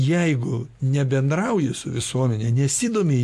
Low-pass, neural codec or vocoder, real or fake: 14.4 kHz; none; real